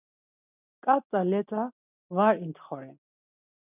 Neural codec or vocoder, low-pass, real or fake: none; 3.6 kHz; real